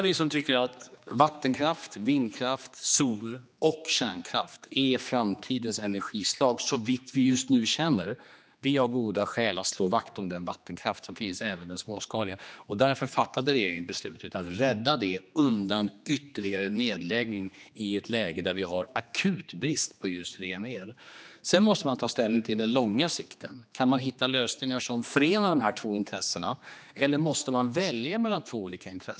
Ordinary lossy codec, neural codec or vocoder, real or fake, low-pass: none; codec, 16 kHz, 2 kbps, X-Codec, HuBERT features, trained on general audio; fake; none